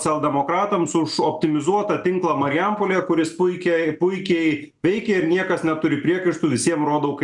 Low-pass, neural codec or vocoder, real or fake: 10.8 kHz; none; real